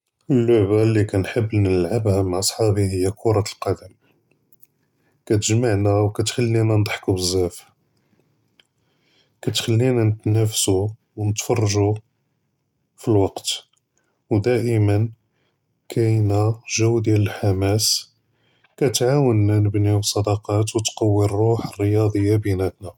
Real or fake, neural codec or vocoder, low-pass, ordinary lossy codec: real; none; 19.8 kHz; none